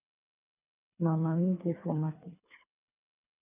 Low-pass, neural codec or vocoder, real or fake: 3.6 kHz; codec, 24 kHz, 3 kbps, HILCodec; fake